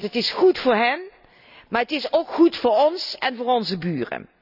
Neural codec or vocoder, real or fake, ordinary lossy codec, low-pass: none; real; none; 5.4 kHz